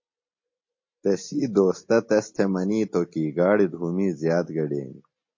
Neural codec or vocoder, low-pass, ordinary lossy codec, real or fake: none; 7.2 kHz; MP3, 32 kbps; real